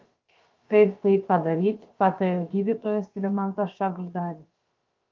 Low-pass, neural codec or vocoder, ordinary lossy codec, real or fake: 7.2 kHz; codec, 16 kHz, about 1 kbps, DyCAST, with the encoder's durations; Opus, 32 kbps; fake